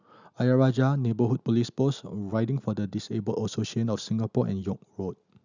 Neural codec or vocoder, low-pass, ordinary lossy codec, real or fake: none; 7.2 kHz; none; real